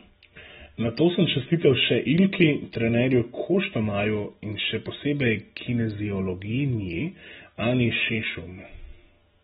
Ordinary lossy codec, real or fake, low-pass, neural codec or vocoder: AAC, 16 kbps; real; 19.8 kHz; none